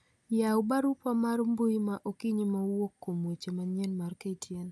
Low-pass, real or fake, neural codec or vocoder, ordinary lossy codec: none; real; none; none